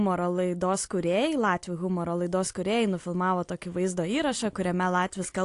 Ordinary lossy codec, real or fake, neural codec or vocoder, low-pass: AAC, 48 kbps; real; none; 10.8 kHz